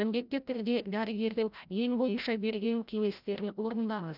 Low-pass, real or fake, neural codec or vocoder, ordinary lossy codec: 5.4 kHz; fake; codec, 16 kHz, 0.5 kbps, FreqCodec, larger model; none